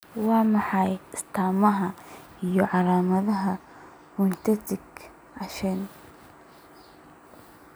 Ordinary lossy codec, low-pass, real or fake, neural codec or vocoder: none; none; real; none